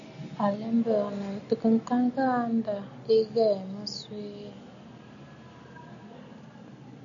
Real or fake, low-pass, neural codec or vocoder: real; 7.2 kHz; none